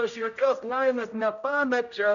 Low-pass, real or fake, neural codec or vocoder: 7.2 kHz; fake; codec, 16 kHz, 0.5 kbps, X-Codec, HuBERT features, trained on general audio